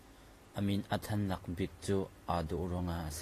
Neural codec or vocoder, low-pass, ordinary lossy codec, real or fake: none; 14.4 kHz; AAC, 48 kbps; real